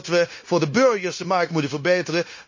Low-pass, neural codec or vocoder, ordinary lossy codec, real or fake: 7.2 kHz; codec, 16 kHz, 0.9 kbps, LongCat-Audio-Codec; MP3, 32 kbps; fake